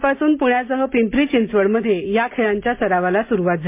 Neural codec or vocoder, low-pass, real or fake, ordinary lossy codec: none; 3.6 kHz; real; MP3, 24 kbps